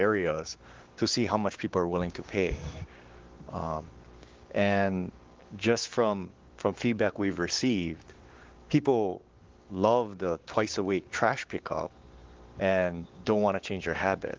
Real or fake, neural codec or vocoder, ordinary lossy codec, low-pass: fake; codec, 16 kHz, 6 kbps, DAC; Opus, 16 kbps; 7.2 kHz